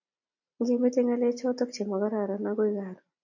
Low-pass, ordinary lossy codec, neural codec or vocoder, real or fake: 7.2 kHz; AAC, 48 kbps; none; real